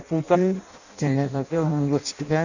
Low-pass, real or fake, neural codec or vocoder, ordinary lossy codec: 7.2 kHz; fake; codec, 16 kHz in and 24 kHz out, 0.6 kbps, FireRedTTS-2 codec; none